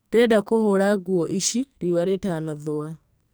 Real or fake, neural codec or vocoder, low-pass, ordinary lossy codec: fake; codec, 44.1 kHz, 2.6 kbps, SNAC; none; none